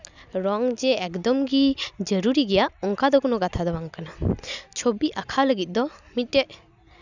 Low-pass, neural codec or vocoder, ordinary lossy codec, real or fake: 7.2 kHz; none; none; real